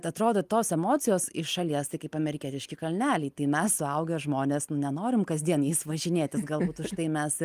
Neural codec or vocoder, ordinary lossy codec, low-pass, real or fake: none; Opus, 32 kbps; 14.4 kHz; real